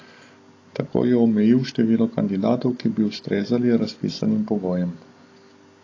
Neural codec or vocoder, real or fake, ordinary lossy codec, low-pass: none; real; AAC, 32 kbps; 7.2 kHz